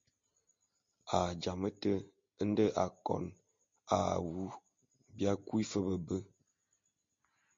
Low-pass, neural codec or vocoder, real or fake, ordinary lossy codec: 7.2 kHz; none; real; MP3, 48 kbps